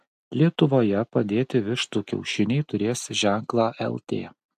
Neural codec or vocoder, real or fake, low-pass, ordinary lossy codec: none; real; 14.4 kHz; AAC, 96 kbps